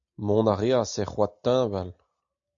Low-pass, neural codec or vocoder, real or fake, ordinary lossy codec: 7.2 kHz; none; real; AAC, 64 kbps